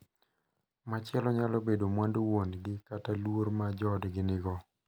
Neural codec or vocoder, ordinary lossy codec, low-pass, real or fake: none; none; none; real